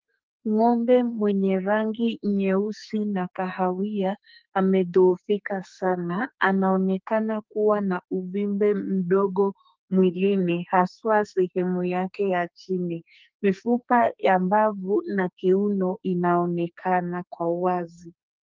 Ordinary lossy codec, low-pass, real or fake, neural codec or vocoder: Opus, 32 kbps; 7.2 kHz; fake; codec, 44.1 kHz, 2.6 kbps, SNAC